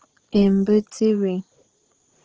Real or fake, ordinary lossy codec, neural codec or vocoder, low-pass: fake; Opus, 16 kbps; vocoder, 44.1 kHz, 80 mel bands, Vocos; 7.2 kHz